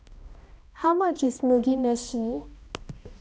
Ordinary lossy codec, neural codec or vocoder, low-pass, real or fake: none; codec, 16 kHz, 1 kbps, X-Codec, HuBERT features, trained on balanced general audio; none; fake